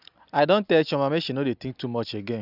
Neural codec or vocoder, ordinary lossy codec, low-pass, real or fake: none; none; 5.4 kHz; real